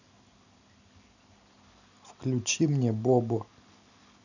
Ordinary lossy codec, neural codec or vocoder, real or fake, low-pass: none; none; real; 7.2 kHz